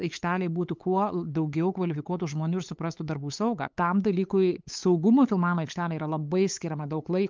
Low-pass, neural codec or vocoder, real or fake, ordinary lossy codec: 7.2 kHz; codec, 16 kHz, 8 kbps, FunCodec, trained on LibriTTS, 25 frames a second; fake; Opus, 32 kbps